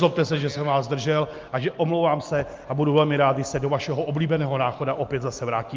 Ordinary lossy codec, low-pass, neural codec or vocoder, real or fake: Opus, 24 kbps; 7.2 kHz; none; real